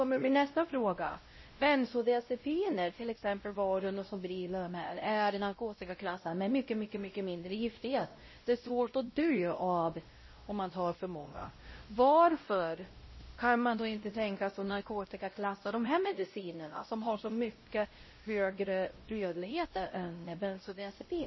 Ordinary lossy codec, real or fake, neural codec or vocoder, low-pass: MP3, 24 kbps; fake; codec, 16 kHz, 0.5 kbps, X-Codec, WavLM features, trained on Multilingual LibriSpeech; 7.2 kHz